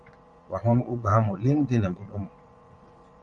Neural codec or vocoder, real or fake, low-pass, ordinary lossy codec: vocoder, 22.05 kHz, 80 mel bands, Vocos; fake; 9.9 kHz; MP3, 96 kbps